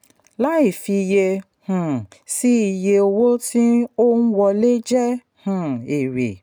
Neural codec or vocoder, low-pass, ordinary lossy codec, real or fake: none; none; none; real